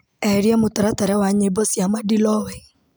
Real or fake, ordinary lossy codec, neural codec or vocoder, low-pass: real; none; none; none